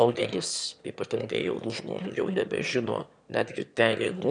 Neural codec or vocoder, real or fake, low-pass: autoencoder, 22.05 kHz, a latent of 192 numbers a frame, VITS, trained on one speaker; fake; 9.9 kHz